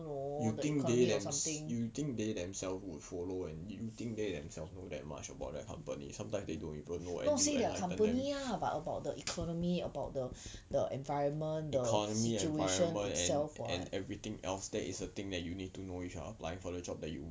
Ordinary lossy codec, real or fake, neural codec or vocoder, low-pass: none; real; none; none